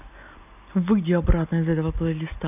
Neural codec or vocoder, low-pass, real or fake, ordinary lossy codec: none; 3.6 kHz; real; none